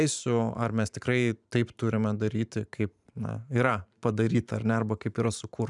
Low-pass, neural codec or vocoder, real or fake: 10.8 kHz; vocoder, 44.1 kHz, 128 mel bands every 512 samples, BigVGAN v2; fake